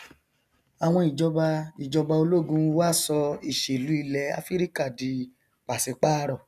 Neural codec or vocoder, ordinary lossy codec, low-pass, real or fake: none; none; 14.4 kHz; real